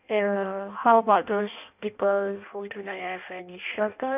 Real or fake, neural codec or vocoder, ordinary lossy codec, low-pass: fake; codec, 16 kHz in and 24 kHz out, 0.6 kbps, FireRedTTS-2 codec; none; 3.6 kHz